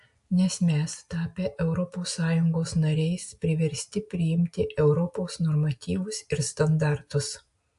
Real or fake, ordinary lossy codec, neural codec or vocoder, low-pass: real; AAC, 64 kbps; none; 10.8 kHz